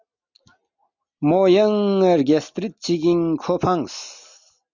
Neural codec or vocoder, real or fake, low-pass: none; real; 7.2 kHz